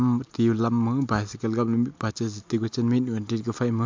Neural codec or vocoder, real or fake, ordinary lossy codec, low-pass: none; real; MP3, 64 kbps; 7.2 kHz